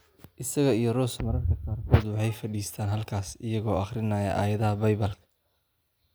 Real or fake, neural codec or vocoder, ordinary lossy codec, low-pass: real; none; none; none